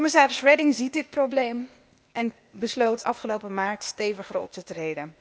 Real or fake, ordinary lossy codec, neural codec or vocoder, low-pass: fake; none; codec, 16 kHz, 0.8 kbps, ZipCodec; none